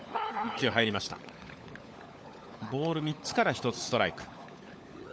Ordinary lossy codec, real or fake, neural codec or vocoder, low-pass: none; fake; codec, 16 kHz, 16 kbps, FunCodec, trained on LibriTTS, 50 frames a second; none